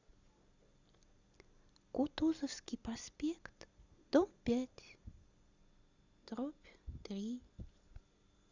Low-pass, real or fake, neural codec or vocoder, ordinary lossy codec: 7.2 kHz; real; none; Opus, 64 kbps